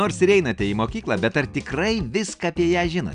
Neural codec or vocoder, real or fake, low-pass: none; real; 9.9 kHz